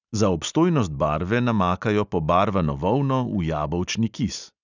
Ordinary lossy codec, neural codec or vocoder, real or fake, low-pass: none; none; real; 7.2 kHz